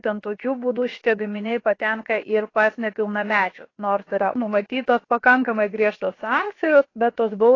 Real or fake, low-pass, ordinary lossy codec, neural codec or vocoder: fake; 7.2 kHz; AAC, 32 kbps; codec, 16 kHz, about 1 kbps, DyCAST, with the encoder's durations